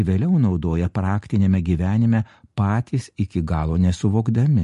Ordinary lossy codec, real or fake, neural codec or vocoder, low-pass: MP3, 48 kbps; real; none; 14.4 kHz